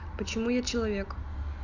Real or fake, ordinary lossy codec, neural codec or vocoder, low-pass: real; none; none; 7.2 kHz